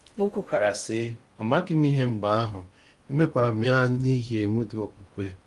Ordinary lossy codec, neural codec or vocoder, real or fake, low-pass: Opus, 32 kbps; codec, 16 kHz in and 24 kHz out, 0.6 kbps, FocalCodec, streaming, 2048 codes; fake; 10.8 kHz